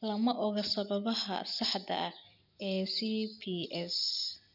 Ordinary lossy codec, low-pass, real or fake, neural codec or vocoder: none; 5.4 kHz; real; none